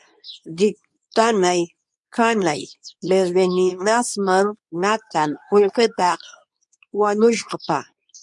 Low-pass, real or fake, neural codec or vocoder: 10.8 kHz; fake; codec, 24 kHz, 0.9 kbps, WavTokenizer, medium speech release version 2